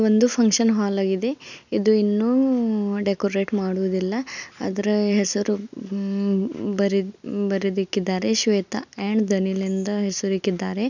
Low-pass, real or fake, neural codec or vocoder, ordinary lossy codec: 7.2 kHz; real; none; none